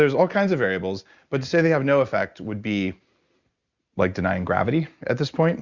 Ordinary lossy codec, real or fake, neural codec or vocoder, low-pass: Opus, 64 kbps; real; none; 7.2 kHz